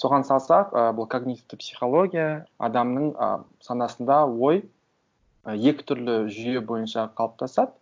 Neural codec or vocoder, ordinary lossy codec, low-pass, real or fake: none; none; none; real